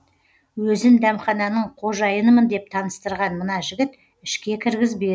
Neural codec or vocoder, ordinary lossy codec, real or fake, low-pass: none; none; real; none